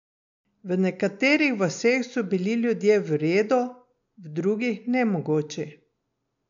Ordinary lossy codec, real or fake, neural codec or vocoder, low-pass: MP3, 64 kbps; real; none; 7.2 kHz